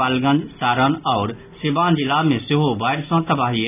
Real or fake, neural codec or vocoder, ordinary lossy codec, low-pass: real; none; none; 3.6 kHz